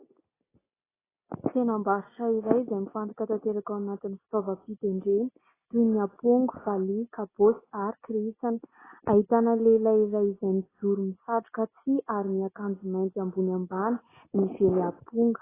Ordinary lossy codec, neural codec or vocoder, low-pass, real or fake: AAC, 16 kbps; none; 3.6 kHz; real